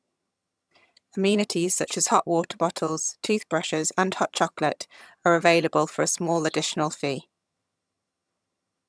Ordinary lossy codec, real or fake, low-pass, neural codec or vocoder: none; fake; none; vocoder, 22.05 kHz, 80 mel bands, HiFi-GAN